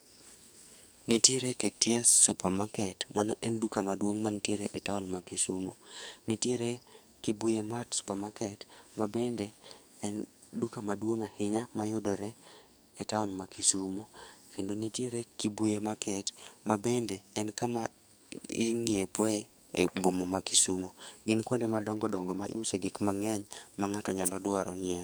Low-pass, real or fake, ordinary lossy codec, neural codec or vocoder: none; fake; none; codec, 44.1 kHz, 2.6 kbps, SNAC